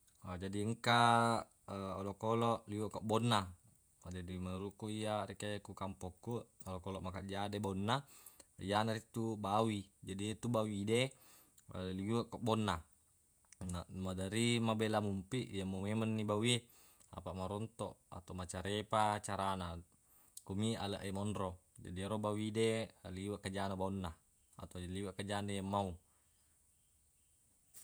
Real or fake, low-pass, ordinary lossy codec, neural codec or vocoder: fake; none; none; vocoder, 48 kHz, 128 mel bands, Vocos